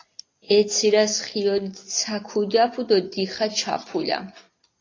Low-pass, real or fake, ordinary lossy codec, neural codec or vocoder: 7.2 kHz; real; AAC, 32 kbps; none